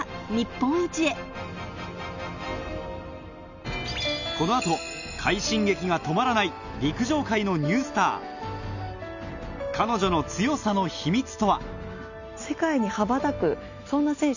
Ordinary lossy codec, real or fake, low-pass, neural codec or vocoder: none; real; 7.2 kHz; none